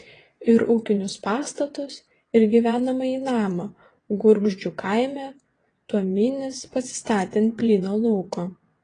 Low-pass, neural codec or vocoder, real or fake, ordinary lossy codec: 9.9 kHz; vocoder, 22.05 kHz, 80 mel bands, WaveNeXt; fake; AAC, 32 kbps